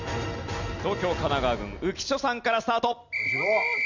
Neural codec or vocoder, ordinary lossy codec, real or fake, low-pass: none; none; real; 7.2 kHz